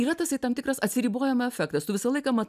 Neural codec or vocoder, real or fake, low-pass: none; real; 14.4 kHz